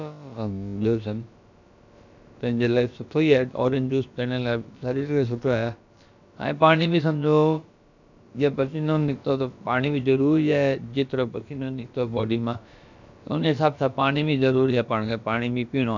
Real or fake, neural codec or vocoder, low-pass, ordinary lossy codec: fake; codec, 16 kHz, about 1 kbps, DyCAST, with the encoder's durations; 7.2 kHz; none